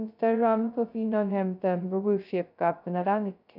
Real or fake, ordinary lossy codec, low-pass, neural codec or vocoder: fake; none; 5.4 kHz; codec, 16 kHz, 0.2 kbps, FocalCodec